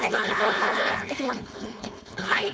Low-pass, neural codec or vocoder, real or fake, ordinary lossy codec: none; codec, 16 kHz, 4.8 kbps, FACodec; fake; none